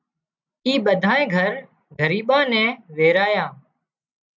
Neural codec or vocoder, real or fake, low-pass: none; real; 7.2 kHz